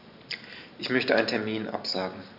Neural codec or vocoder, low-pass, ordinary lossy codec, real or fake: none; 5.4 kHz; none; real